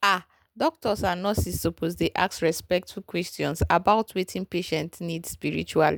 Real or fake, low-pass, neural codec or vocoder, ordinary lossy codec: real; none; none; none